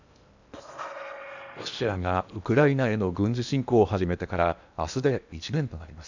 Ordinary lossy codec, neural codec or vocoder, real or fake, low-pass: none; codec, 16 kHz in and 24 kHz out, 0.8 kbps, FocalCodec, streaming, 65536 codes; fake; 7.2 kHz